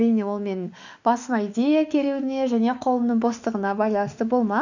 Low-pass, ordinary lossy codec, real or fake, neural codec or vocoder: 7.2 kHz; none; fake; autoencoder, 48 kHz, 32 numbers a frame, DAC-VAE, trained on Japanese speech